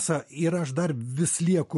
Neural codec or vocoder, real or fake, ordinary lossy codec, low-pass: none; real; MP3, 48 kbps; 14.4 kHz